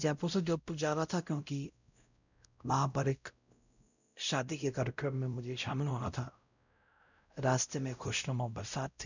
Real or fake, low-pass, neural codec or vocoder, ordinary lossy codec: fake; 7.2 kHz; codec, 16 kHz, 0.5 kbps, X-Codec, WavLM features, trained on Multilingual LibriSpeech; none